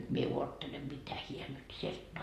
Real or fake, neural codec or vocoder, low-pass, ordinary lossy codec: real; none; 14.4 kHz; AAC, 48 kbps